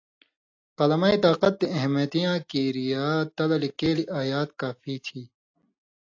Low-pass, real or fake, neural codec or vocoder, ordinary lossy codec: 7.2 kHz; real; none; AAC, 48 kbps